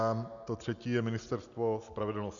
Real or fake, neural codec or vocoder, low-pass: real; none; 7.2 kHz